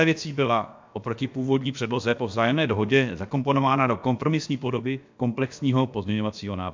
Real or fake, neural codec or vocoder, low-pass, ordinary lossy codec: fake; codec, 16 kHz, about 1 kbps, DyCAST, with the encoder's durations; 7.2 kHz; MP3, 64 kbps